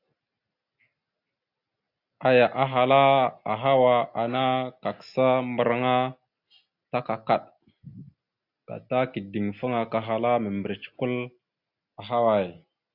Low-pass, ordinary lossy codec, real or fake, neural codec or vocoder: 5.4 kHz; Opus, 64 kbps; real; none